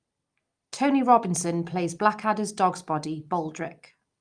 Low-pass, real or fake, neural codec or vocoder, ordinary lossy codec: 9.9 kHz; real; none; Opus, 32 kbps